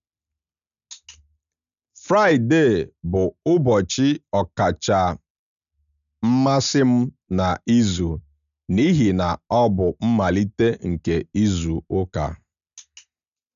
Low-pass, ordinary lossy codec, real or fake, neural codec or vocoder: 7.2 kHz; none; real; none